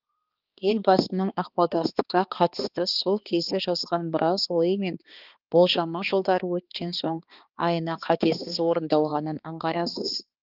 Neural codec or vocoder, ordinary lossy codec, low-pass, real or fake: codec, 16 kHz, 2 kbps, X-Codec, HuBERT features, trained on balanced general audio; Opus, 32 kbps; 5.4 kHz; fake